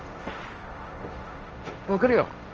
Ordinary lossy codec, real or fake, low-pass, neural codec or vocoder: Opus, 24 kbps; fake; 7.2 kHz; codec, 16 kHz, 1.1 kbps, Voila-Tokenizer